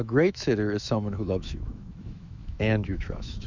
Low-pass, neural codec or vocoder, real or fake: 7.2 kHz; autoencoder, 48 kHz, 128 numbers a frame, DAC-VAE, trained on Japanese speech; fake